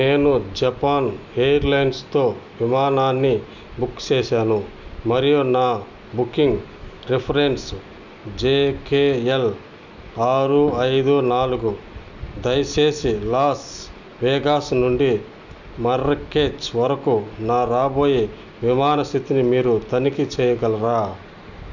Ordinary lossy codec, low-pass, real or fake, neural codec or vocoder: none; 7.2 kHz; real; none